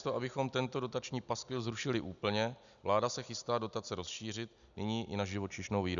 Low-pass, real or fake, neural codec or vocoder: 7.2 kHz; real; none